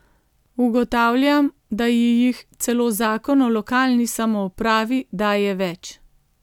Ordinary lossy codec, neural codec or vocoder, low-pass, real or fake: none; none; 19.8 kHz; real